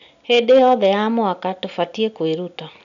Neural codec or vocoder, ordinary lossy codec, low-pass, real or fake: none; none; 7.2 kHz; real